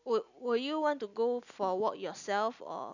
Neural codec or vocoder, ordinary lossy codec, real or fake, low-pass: none; none; real; 7.2 kHz